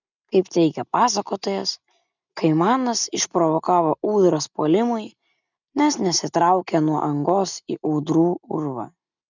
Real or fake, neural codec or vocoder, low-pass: real; none; 7.2 kHz